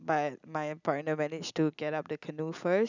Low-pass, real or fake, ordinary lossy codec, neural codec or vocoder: 7.2 kHz; real; none; none